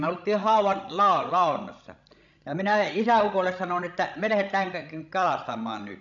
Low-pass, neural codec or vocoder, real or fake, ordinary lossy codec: 7.2 kHz; codec, 16 kHz, 16 kbps, FreqCodec, larger model; fake; none